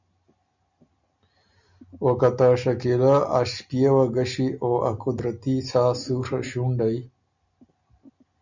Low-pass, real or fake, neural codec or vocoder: 7.2 kHz; real; none